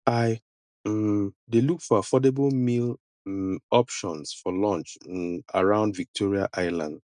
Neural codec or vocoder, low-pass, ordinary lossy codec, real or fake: none; 9.9 kHz; none; real